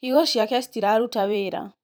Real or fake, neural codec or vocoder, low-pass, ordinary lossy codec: fake; vocoder, 44.1 kHz, 128 mel bands every 512 samples, BigVGAN v2; none; none